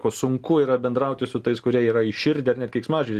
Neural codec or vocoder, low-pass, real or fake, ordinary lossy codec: none; 14.4 kHz; real; Opus, 24 kbps